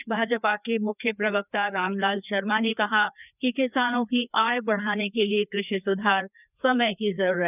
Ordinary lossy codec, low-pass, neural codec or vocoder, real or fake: none; 3.6 kHz; codec, 16 kHz, 2 kbps, FreqCodec, larger model; fake